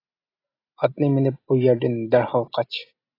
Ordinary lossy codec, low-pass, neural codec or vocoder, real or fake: AAC, 24 kbps; 5.4 kHz; none; real